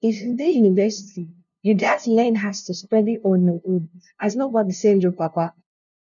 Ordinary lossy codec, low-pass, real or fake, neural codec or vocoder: none; 7.2 kHz; fake; codec, 16 kHz, 0.5 kbps, FunCodec, trained on LibriTTS, 25 frames a second